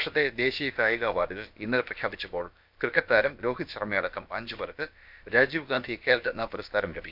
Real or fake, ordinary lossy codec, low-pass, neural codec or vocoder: fake; none; 5.4 kHz; codec, 16 kHz, about 1 kbps, DyCAST, with the encoder's durations